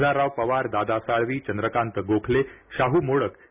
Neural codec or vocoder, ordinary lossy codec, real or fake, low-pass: none; none; real; 3.6 kHz